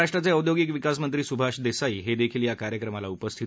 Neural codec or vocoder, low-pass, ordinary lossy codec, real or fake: none; none; none; real